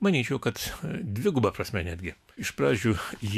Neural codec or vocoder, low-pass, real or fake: autoencoder, 48 kHz, 128 numbers a frame, DAC-VAE, trained on Japanese speech; 14.4 kHz; fake